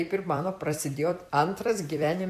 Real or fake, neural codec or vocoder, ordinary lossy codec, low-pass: fake; vocoder, 44.1 kHz, 128 mel bands every 256 samples, BigVGAN v2; AAC, 96 kbps; 14.4 kHz